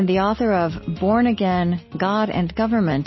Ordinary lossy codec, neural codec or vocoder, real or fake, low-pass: MP3, 24 kbps; none; real; 7.2 kHz